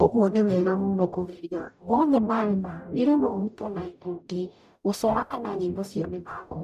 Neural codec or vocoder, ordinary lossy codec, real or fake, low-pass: codec, 44.1 kHz, 0.9 kbps, DAC; none; fake; 14.4 kHz